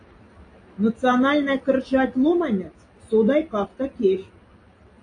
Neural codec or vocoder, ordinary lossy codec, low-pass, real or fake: none; AAC, 48 kbps; 9.9 kHz; real